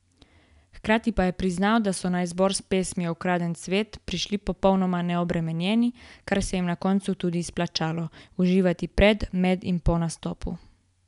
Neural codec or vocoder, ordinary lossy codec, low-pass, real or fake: none; none; 10.8 kHz; real